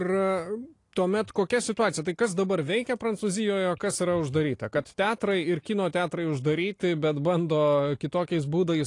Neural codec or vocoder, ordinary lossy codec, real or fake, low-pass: none; AAC, 48 kbps; real; 10.8 kHz